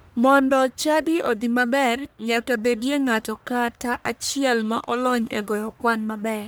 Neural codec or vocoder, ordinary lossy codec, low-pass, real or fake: codec, 44.1 kHz, 1.7 kbps, Pupu-Codec; none; none; fake